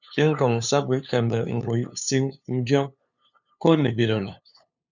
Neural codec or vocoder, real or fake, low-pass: codec, 16 kHz, 2 kbps, FunCodec, trained on LibriTTS, 25 frames a second; fake; 7.2 kHz